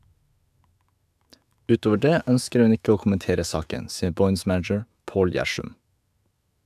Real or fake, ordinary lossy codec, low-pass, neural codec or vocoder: fake; AAC, 96 kbps; 14.4 kHz; autoencoder, 48 kHz, 128 numbers a frame, DAC-VAE, trained on Japanese speech